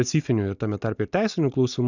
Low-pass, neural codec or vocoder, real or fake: 7.2 kHz; none; real